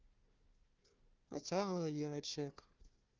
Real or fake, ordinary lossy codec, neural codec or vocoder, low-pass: fake; Opus, 24 kbps; codec, 16 kHz, 1 kbps, FunCodec, trained on Chinese and English, 50 frames a second; 7.2 kHz